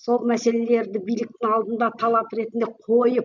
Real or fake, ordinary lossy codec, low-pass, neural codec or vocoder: real; none; 7.2 kHz; none